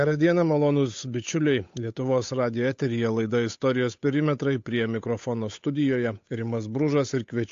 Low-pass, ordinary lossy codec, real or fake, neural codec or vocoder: 7.2 kHz; AAC, 48 kbps; fake; codec, 16 kHz, 8 kbps, FunCodec, trained on Chinese and English, 25 frames a second